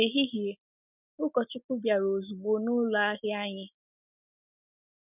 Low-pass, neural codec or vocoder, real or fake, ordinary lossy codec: 3.6 kHz; none; real; none